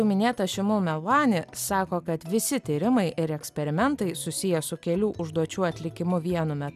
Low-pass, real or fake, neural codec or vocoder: 14.4 kHz; real; none